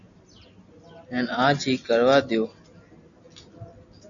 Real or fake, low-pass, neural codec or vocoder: real; 7.2 kHz; none